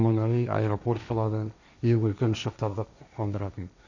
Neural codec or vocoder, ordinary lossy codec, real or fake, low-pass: codec, 16 kHz, 1.1 kbps, Voila-Tokenizer; none; fake; 7.2 kHz